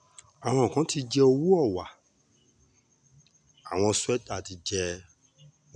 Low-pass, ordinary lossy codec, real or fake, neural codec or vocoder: 9.9 kHz; none; real; none